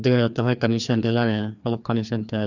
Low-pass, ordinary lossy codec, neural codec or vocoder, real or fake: 7.2 kHz; none; codec, 16 kHz, 2 kbps, FreqCodec, larger model; fake